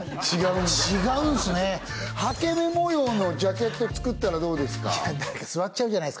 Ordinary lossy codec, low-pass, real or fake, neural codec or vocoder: none; none; real; none